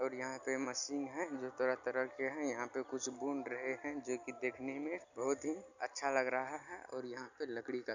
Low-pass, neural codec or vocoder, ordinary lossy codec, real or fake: 7.2 kHz; none; none; real